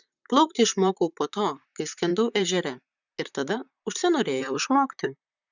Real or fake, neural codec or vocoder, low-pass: fake; vocoder, 44.1 kHz, 128 mel bands, Pupu-Vocoder; 7.2 kHz